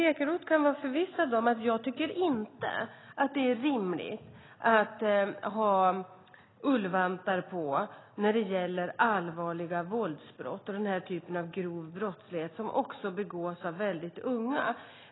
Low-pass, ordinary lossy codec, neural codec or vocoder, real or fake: 7.2 kHz; AAC, 16 kbps; none; real